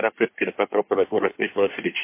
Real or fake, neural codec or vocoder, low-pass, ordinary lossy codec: fake; codec, 24 kHz, 1 kbps, SNAC; 3.6 kHz; MP3, 24 kbps